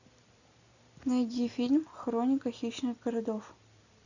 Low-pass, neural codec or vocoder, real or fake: 7.2 kHz; none; real